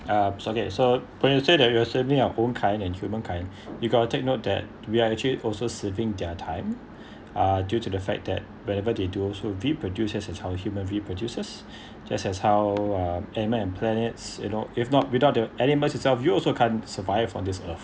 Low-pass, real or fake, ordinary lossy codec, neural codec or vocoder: none; real; none; none